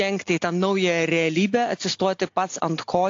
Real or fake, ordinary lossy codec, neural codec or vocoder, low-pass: real; AAC, 48 kbps; none; 7.2 kHz